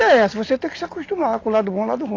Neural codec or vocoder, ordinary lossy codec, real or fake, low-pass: none; AAC, 32 kbps; real; 7.2 kHz